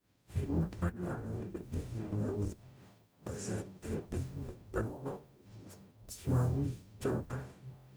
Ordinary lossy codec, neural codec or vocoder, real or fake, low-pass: none; codec, 44.1 kHz, 0.9 kbps, DAC; fake; none